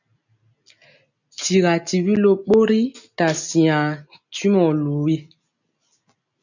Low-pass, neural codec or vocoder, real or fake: 7.2 kHz; none; real